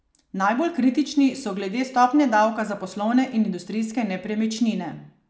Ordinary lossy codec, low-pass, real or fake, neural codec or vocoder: none; none; real; none